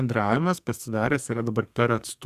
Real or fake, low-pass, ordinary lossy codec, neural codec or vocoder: fake; 14.4 kHz; Opus, 64 kbps; codec, 44.1 kHz, 2.6 kbps, SNAC